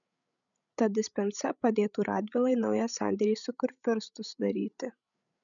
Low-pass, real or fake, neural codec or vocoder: 7.2 kHz; fake; codec, 16 kHz, 16 kbps, FreqCodec, larger model